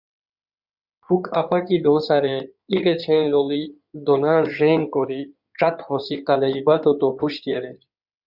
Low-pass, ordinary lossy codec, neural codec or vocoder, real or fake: 5.4 kHz; Opus, 64 kbps; codec, 16 kHz in and 24 kHz out, 2.2 kbps, FireRedTTS-2 codec; fake